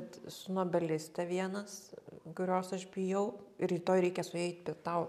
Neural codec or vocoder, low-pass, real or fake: none; 14.4 kHz; real